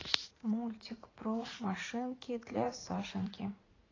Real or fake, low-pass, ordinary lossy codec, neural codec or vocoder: fake; 7.2 kHz; AAC, 32 kbps; vocoder, 44.1 kHz, 80 mel bands, Vocos